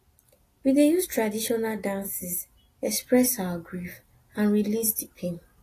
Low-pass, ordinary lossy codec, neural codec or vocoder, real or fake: 14.4 kHz; AAC, 48 kbps; none; real